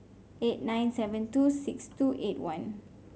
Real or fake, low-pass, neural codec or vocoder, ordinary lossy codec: real; none; none; none